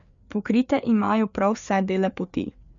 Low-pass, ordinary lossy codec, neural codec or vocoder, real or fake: 7.2 kHz; none; codec, 16 kHz, 4 kbps, FreqCodec, larger model; fake